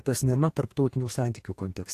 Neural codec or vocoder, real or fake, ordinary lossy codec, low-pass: codec, 44.1 kHz, 2.6 kbps, DAC; fake; AAC, 64 kbps; 14.4 kHz